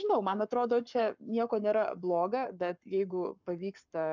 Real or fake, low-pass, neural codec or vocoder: fake; 7.2 kHz; codec, 44.1 kHz, 7.8 kbps, Pupu-Codec